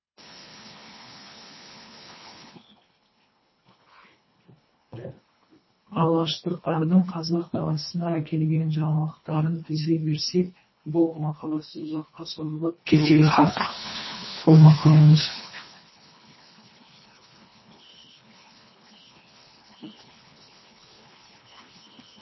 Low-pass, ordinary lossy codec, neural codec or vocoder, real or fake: 7.2 kHz; MP3, 24 kbps; codec, 24 kHz, 1.5 kbps, HILCodec; fake